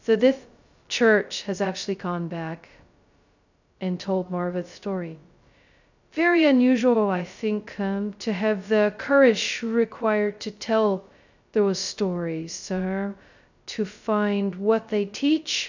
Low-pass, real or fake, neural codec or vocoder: 7.2 kHz; fake; codec, 16 kHz, 0.2 kbps, FocalCodec